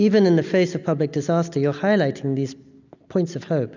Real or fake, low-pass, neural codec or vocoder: real; 7.2 kHz; none